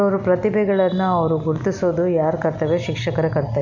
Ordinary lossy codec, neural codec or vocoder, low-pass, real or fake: none; none; 7.2 kHz; real